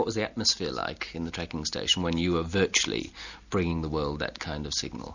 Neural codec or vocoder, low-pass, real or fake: none; 7.2 kHz; real